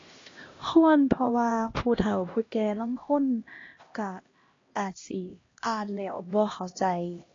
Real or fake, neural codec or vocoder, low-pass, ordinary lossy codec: fake; codec, 16 kHz, 1 kbps, X-Codec, HuBERT features, trained on LibriSpeech; 7.2 kHz; AAC, 32 kbps